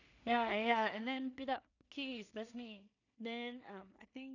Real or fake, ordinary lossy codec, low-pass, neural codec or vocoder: fake; none; 7.2 kHz; codec, 16 kHz in and 24 kHz out, 0.4 kbps, LongCat-Audio-Codec, two codebook decoder